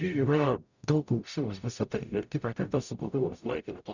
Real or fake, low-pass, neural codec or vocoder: fake; 7.2 kHz; codec, 44.1 kHz, 0.9 kbps, DAC